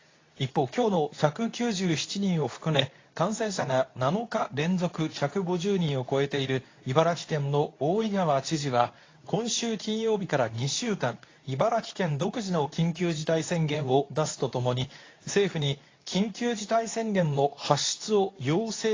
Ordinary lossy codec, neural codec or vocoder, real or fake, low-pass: AAC, 32 kbps; codec, 24 kHz, 0.9 kbps, WavTokenizer, medium speech release version 2; fake; 7.2 kHz